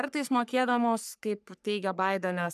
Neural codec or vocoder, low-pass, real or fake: codec, 44.1 kHz, 3.4 kbps, Pupu-Codec; 14.4 kHz; fake